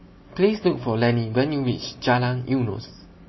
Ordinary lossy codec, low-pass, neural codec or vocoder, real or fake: MP3, 24 kbps; 7.2 kHz; none; real